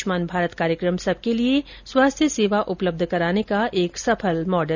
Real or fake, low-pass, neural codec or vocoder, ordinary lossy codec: real; 7.2 kHz; none; none